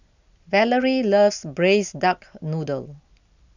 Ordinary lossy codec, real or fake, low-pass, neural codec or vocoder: none; real; 7.2 kHz; none